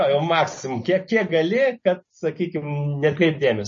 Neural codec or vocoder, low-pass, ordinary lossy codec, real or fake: none; 7.2 kHz; MP3, 32 kbps; real